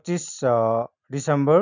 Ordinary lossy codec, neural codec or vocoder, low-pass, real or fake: none; none; 7.2 kHz; real